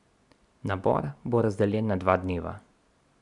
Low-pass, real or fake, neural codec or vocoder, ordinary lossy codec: 10.8 kHz; real; none; none